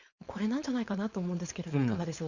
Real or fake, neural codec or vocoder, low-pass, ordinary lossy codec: fake; codec, 16 kHz, 4.8 kbps, FACodec; 7.2 kHz; Opus, 64 kbps